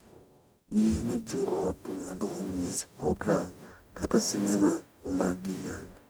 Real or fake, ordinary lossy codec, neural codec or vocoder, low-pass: fake; none; codec, 44.1 kHz, 0.9 kbps, DAC; none